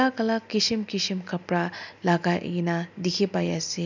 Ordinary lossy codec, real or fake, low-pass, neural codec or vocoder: none; real; 7.2 kHz; none